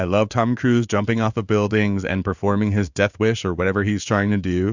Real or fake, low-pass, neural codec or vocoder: fake; 7.2 kHz; codec, 16 kHz in and 24 kHz out, 1 kbps, XY-Tokenizer